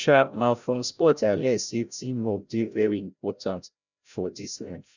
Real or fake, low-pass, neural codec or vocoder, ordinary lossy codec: fake; 7.2 kHz; codec, 16 kHz, 0.5 kbps, FreqCodec, larger model; none